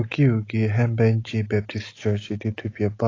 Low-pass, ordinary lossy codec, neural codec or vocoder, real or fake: 7.2 kHz; AAC, 32 kbps; none; real